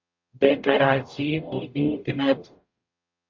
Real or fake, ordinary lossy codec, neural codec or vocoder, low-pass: fake; MP3, 48 kbps; codec, 44.1 kHz, 0.9 kbps, DAC; 7.2 kHz